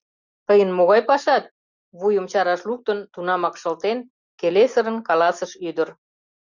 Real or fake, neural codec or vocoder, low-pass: real; none; 7.2 kHz